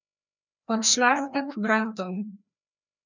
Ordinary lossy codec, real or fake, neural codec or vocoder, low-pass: none; fake; codec, 16 kHz, 1 kbps, FreqCodec, larger model; 7.2 kHz